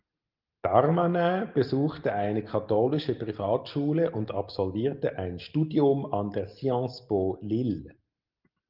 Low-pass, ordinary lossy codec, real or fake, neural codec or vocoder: 5.4 kHz; Opus, 32 kbps; real; none